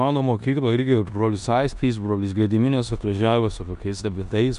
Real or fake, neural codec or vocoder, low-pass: fake; codec, 16 kHz in and 24 kHz out, 0.9 kbps, LongCat-Audio-Codec, four codebook decoder; 10.8 kHz